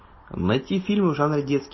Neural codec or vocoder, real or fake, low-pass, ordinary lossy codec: none; real; 7.2 kHz; MP3, 24 kbps